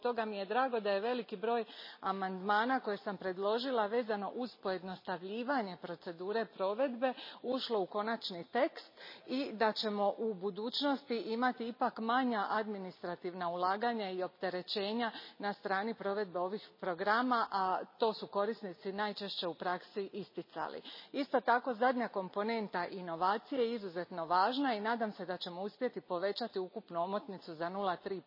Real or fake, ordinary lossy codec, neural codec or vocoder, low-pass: real; MP3, 24 kbps; none; 5.4 kHz